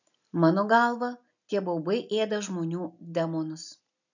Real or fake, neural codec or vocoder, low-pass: real; none; 7.2 kHz